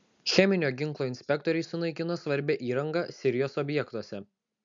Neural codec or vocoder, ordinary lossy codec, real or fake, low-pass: none; MP3, 64 kbps; real; 7.2 kHz